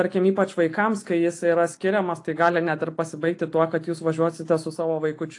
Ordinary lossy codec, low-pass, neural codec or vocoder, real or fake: AAC, 48 kbps; 10.8 kHz; none; real